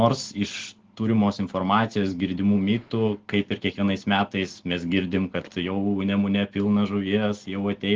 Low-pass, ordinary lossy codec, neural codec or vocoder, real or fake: 7.2 kHz; Opus, 16 kbps; none; real